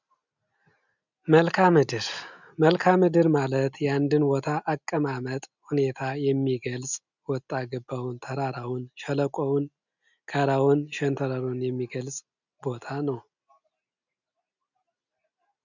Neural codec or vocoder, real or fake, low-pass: none; real; 7.2 kHz